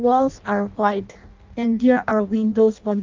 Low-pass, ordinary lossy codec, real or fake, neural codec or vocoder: 7.2 kHz; Opus, 24 kbps; fake; codec, 16 kHz in and 24 kHz out, 0.6 kbps, FireRedTTS-2 codec